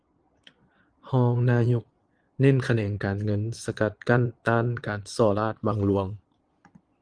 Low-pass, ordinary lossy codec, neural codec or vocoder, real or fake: 9.9 kHz; Opus, 24 kbps; vocoder, 22.05 kHz, 80 mel bands, Vocos; fake